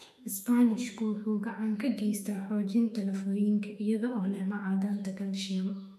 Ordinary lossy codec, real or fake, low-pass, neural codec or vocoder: none; fake; 14.4 kHz; autoencoder, 48 kHz, 32 numbers a frame, DAC-VAE, trained on Japanese speech